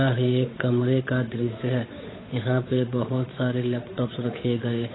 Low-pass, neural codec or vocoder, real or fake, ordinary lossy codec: 7.2 kHz; codec, 16 kHz, 8 kbps, FunCodec, trained on Chinese and English, 25 frames a second; fake; AAC, 16 kbps